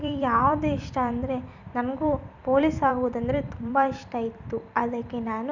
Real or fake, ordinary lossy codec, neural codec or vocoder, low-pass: fake; none; vocoder, 44.1 kHz, 128 mel bands every 256 samples, BigVGAN v2; 7.2 kHz